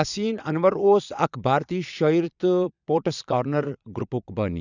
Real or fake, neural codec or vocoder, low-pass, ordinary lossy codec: fake; vocoder, 22.05 kHz, 80 mel bands, Vocos; 7.2 kHz; none